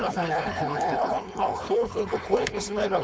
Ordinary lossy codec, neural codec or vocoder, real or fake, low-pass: none; codec, 16 kHz, 4.8 kbps, FACodec; fake; none